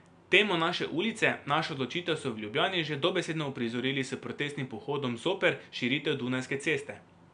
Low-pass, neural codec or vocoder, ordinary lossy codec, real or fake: 9.9 kHz; none; none; real